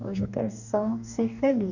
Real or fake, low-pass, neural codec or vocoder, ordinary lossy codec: fake; 7.2 kHz; codec, 44.1 kHz, 2.6 kbps, DAC; none